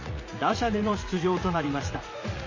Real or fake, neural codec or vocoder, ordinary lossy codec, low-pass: fake; vocoder, 44.1 kHz, 80 mel bands, Vocos; MP3, 32 kbps; 7.2 kHz